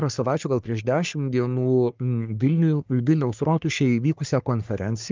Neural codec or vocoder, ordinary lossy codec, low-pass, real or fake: codec, 44.1 kHz, 3.4 kbps, Pupu-Codec; Opus, 24 kbps; 7.2 kHz; fake